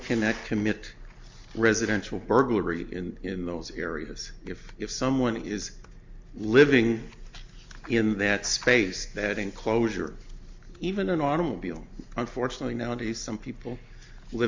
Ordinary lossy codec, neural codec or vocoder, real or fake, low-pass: MP3, 64 kbps; none; real; 7.2 kHz